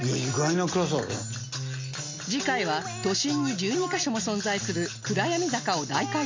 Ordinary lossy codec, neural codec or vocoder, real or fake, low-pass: MP3, 48 kbps; none; real; 7.2 kHz